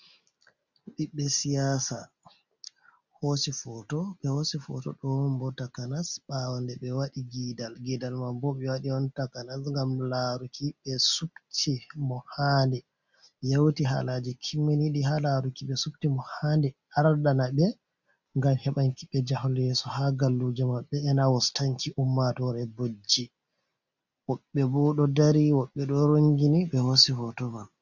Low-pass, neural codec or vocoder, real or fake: 7.2 kHz; none; real